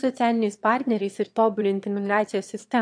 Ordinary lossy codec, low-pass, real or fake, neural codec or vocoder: AAC, 64 kbps; 9.9 kHz; fake; autoencoder, 22.05 kHz, a latent of 192 numbers a frame, VITS, trained on one speaker